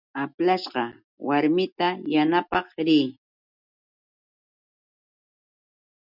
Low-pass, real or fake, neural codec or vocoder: 5.4 kHz; real; none